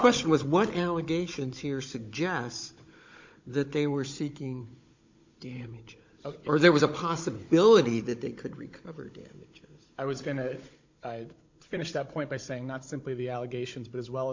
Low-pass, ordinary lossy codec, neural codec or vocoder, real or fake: 7.2 kHz; MP3, 48 kbps; codec, 16 kHz, 16 kbps, FunCodec, trained on Chinese and English, 50 frames a second; fake